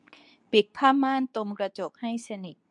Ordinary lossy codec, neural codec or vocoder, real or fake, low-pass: none; codec, 24 kHz, 0.9 kbps, WavTokenizer, medium speech release version 2; fake; 10.8 kHz